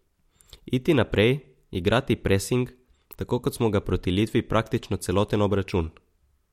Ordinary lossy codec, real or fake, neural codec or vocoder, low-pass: MP3, 64 kbps; real; none; 19.8 kHz